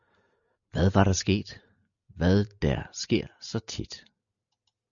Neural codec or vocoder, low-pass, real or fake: none; 7.2 kHz; real